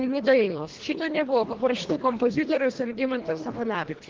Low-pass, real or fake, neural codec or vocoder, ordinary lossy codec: 7.2 kHz; fake; codec, 24 kHz, 1.5 kbps, HILCodec; Opus, 16 kbps